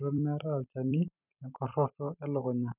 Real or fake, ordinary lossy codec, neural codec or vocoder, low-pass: fake; none; vocoder, 44.1 kHz, 128 mel bands every 256 samples, BigVGAN v2; 3.6 kHz